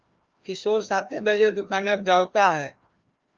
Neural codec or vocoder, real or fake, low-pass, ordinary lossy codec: codec, 16 kHz, 1 kbps, FreqCodec, larger model; fake; 7.2 kHz; Opus, 32 kbps